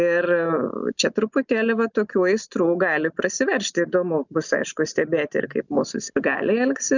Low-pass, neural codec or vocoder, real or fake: 7.2 kHz; none; real